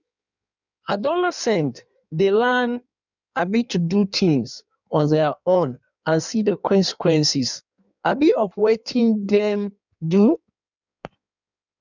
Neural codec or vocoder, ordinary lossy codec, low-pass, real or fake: codec, 16 kHz in and 24 kHz out, 1.1 kbps, FireRedTTS-2 codec; none; 7.2 kHz; fake